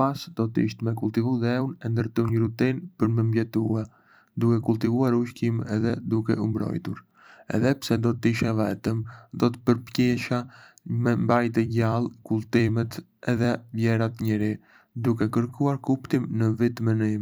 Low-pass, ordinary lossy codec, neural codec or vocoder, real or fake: none; none; vocoder, 44.1 kHz, 128 mel bands every 256 samples, BigVGAN v2; fake